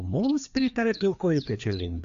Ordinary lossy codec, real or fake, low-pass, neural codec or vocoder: AAC, 96 kbps; fake; 7.2 kHz; codec, 16 kHz, 2 kbps, FreqCodec, larger model